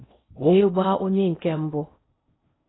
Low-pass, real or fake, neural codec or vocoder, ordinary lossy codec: 7.2 kHz; fake; codec, 16 kHz in and 24 kHz out, 0.6 kbps, FocalCodec, streaming, 4096 codes; AAC, 16 kbps